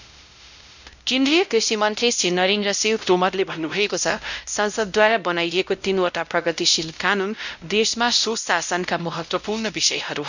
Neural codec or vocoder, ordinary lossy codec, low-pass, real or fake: codec, 16 kHz, 0.5 kbps, X-Codec, WavLM features, trained on Multilingual LibriSpeech; none; 7.2 kHz; fake